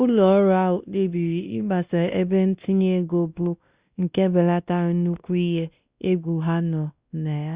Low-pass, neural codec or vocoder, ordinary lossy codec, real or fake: 3.6 kHz; codec, 16 kHz, 1 kbps, X-Codec, WavLM features, trained on Multilingual LibriSpeech; Opus, 64 kbps; fake